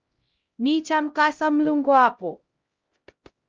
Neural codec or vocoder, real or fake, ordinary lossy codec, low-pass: codec, 16 kHz, 0.5 kbps, X-Codec, HuBERT features, trained on LibriSpeech; fake; Opus, 24 kbps; 7.2 kHz